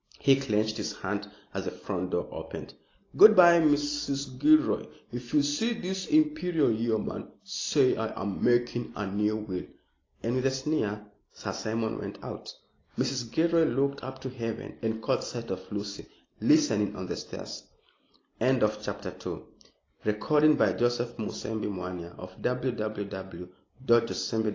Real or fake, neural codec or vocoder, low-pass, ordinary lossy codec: real; none; 7.2 kHz; AAC, 32 kbps